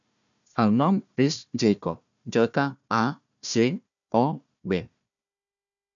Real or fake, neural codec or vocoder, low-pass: fake; codec, 16 kHz, 1 kbps, FunCodec, trained on Chinese and English, 50 frames a second; 7.2 kHz